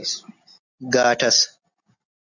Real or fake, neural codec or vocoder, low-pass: real; none; 7.2 kHz